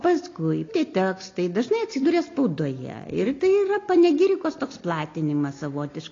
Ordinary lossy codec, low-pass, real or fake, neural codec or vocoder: AAC, 32 kbps; 7.2 kHz; real; none